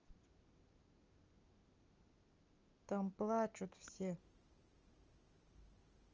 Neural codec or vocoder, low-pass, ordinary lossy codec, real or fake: none; 7.2 kHz; Opus, 24 kbps; real